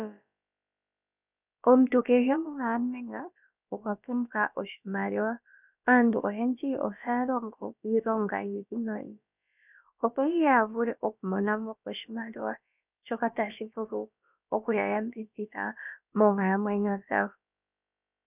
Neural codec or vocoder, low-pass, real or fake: codec, 16 kHz, about 1 kbps, DyCAST, with the encoder's durations; 3.6 kHz; fake